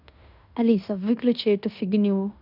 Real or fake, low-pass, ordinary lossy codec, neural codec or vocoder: fake; 5.4 kHz; none; codec, 16 kHz in and 24 kHz out, 0.9 kbps, LongCat-Audio-Codec, fine tuned four codebook decoder